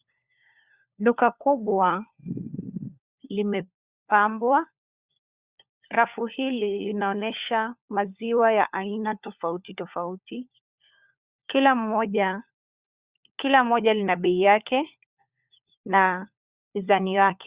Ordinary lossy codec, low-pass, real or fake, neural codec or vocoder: Opus, 64 kbps; 3.6 kHz; fake; codec, 16 kHz, 4 kbps, FunCodec, trained on LibriTTS, 50 frames a second